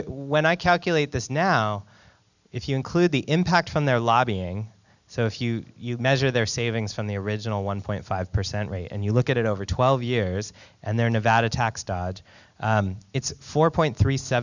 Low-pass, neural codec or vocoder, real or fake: 7.2 kHz; none; real